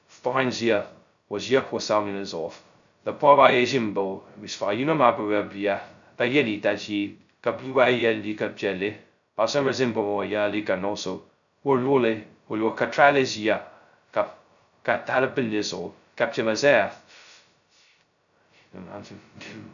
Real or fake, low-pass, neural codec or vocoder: fake; 7.2 kHz; codec, 16 kHz, 0.2 kbps, FocalCodec